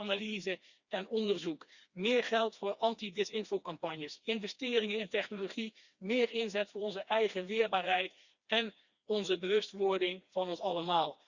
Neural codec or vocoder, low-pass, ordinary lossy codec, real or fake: codec, 16 kHz, 2 kbps, FreqCodec, smaller model; 7.2 kHz; Opus, 64 kbps; fake